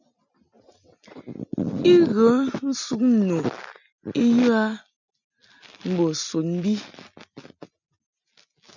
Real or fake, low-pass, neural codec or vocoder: real; 7.2 kHz; none